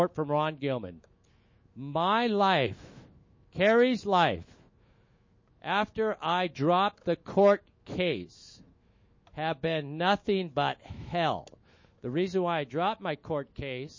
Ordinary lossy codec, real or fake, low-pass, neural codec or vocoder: MP3, 32 kbps; fake; 7.2 kHz; autoencoder, 48 kHz, 128 numbers a frame, DAC-VAE, trained on Japanese speech